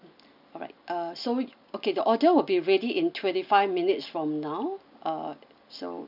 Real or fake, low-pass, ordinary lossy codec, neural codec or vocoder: real; 5.4 kHz; none; none